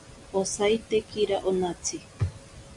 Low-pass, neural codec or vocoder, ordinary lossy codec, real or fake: 10.8 kHz; none; MP3, 64 kbps; real